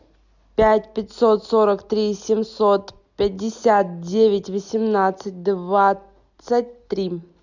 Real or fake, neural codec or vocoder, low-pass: real; none; 7.2 kHz